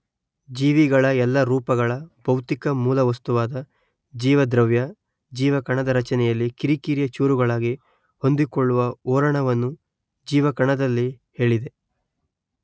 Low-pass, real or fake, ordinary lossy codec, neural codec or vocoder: none; real; none; none